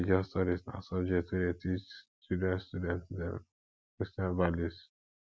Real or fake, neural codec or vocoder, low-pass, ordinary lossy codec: real; none; none; none